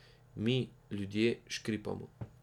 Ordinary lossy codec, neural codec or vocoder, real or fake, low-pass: none; none; real; 19.8 kHz